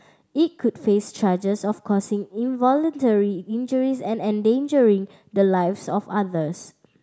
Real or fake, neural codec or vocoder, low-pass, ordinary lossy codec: real; none; none; none